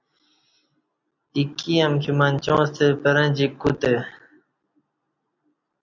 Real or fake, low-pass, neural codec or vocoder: real; 7.2 kHz; none